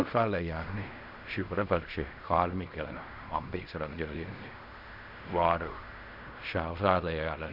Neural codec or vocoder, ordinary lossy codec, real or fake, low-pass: codec, 16 kHz in and 24 kHz out, 0.4 kbps, LongCat-Audio-Codec, fine tuned four codebook decoder; none; fake; 5.4 kHz